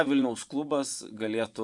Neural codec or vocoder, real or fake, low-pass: vocoder, 24 kHz, 100 mel bands, Vocos; fake; 10.8 kHz